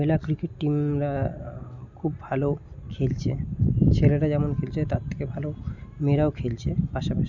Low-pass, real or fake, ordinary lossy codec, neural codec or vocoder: 7.2 kHz; real; none; none